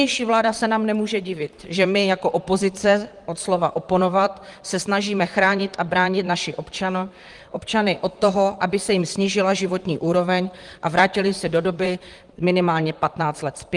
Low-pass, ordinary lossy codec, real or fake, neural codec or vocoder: 10.8 kHz; Opus, 24 kbps; fake; vocoder, 44.1 kHz, 128 mel bands, Pupu-Vocoder